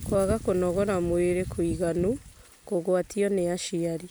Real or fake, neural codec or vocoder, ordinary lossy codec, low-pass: real; none; none; none